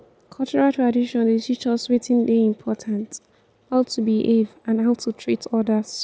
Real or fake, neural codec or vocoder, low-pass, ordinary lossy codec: real; none; none; none